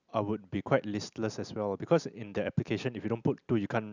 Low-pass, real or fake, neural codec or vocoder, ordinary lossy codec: 7.2 kHz; real; none; none